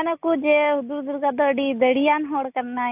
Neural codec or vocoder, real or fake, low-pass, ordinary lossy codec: none; real; 3.6 kHz; AAC, 32 kbps